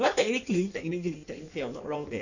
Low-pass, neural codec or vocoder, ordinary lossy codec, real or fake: 7.2 kHz; codec, 16 kHz in and 24 kHz out, 1.1 kbps, FireRedTTS-2 codec; none; fake